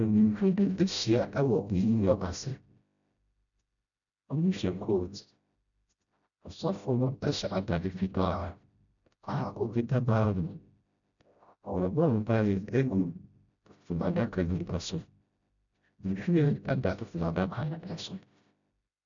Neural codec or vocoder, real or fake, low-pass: codec, 16 kHz, 0.5 kbps, FreqCodec, smaller model; fake; 7.2 kHz